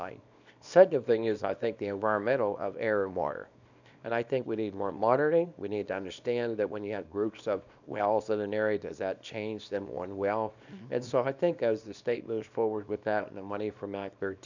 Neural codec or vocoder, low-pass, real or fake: codec, 24 kHz, 0.9 kbps, WavTokenizer, small release; 7.2 kHz; fake